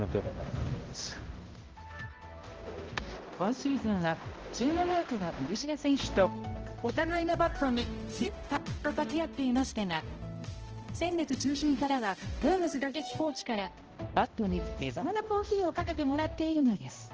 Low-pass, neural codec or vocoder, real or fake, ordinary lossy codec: 7.2 kHz; codec, 16 kHz, 0.5 kbps, X-Codec, HuBERT features, trained on balanced general audio; fake; Opus, 16 kbps